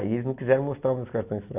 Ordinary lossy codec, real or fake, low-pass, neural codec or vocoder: none; real; 3.6 kHz; none